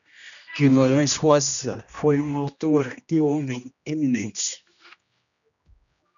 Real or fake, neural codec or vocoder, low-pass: fake; codec, 16 kHz, 1 kbps, X-Codec, HuBERT features, trained on general audio; 7.2 kHz